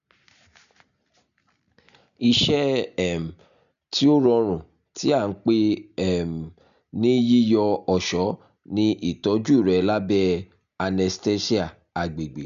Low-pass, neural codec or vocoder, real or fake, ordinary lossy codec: 7.2 kHz; none; real; none